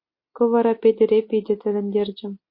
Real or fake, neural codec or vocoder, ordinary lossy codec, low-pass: real; none; MP3, 32 kbps; 5.4 kHz